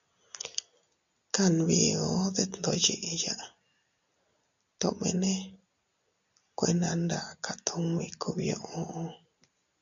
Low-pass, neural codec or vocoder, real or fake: 7.2 kHz; none; real